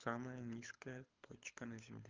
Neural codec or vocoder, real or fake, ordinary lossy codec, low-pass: codec, 24 kHz, 6 kbps, HILCodec; fake; Opus, 32 kbps; 7.2 kHz